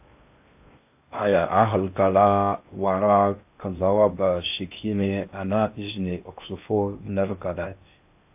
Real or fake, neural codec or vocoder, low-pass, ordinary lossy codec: fake; codec, 16 kHz in and 24 kHz out, 0.6 kbps, FocalCodec, streaming, 4096 codes; 3.6 kHz; AAC, 32 kbps